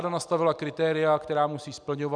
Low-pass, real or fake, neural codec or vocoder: 9.9 kHz; real; none